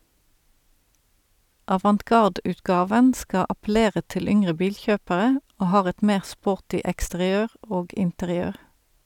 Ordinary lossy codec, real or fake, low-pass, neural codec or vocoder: none; real; 19.8 kHz; none